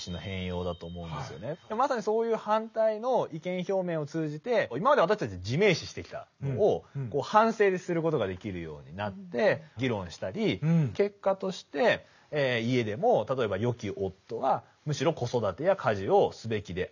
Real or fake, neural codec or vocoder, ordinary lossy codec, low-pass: real; none; none; 7.2 kHz